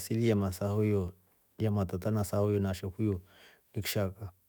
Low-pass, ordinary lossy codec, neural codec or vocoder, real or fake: none; none; autoencoder, 48 kHz, 128 numbers a frame, DAC-VAE, trained on Japanese speech; fake